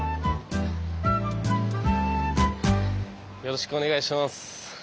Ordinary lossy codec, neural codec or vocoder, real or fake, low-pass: none; none; real; none